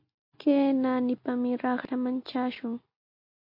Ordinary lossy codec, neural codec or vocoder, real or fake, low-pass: MP3, 32 kbps; none; real; 5.4 kHz